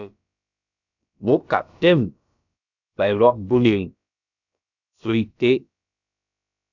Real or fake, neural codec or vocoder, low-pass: fake; codec, 16 kHz, about 1 kbps, DyCAST, with the encoder's durations; 7.2 kHz